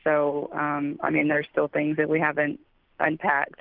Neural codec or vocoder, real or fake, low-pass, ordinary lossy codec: none; real; 5.4 kHz; Opus, 24 kbps